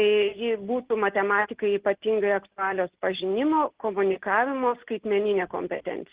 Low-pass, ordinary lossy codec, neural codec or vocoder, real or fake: 3.6 kHz; Opus, 16 kbps; none; real